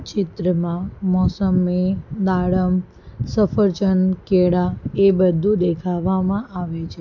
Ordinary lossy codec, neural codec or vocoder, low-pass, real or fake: none; none; 7.2 kHz; real